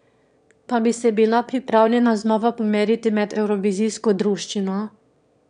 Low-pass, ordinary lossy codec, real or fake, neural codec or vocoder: 9.9 kHz; none; fake; autoencoder, 22.05 kHz, a latent of 192 numbers a frame, VITS, trained on one speaker